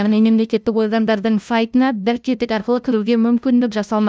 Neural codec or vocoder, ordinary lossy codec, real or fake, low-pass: codec, 16 kHz, 0.5 kbps, FunCodec, trained on LibriTTS, 25 frames a second; none; fake; none